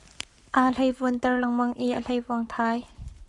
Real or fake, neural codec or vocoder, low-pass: fake; codec, 44.1 kHz, 7.8 kbps, Pupu-Codec; 10.8 kHz